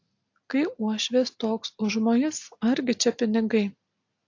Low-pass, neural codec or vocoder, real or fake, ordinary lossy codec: 7.2 kHz; none; real; AAC, 48 kbps